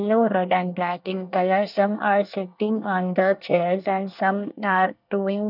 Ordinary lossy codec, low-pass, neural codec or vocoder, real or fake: none; 5.4 kHz; codec, 24 kHz, 1 kbps, SNAC; fake